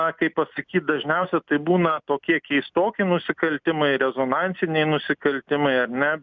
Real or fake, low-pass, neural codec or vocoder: real; 7.2 kHz; none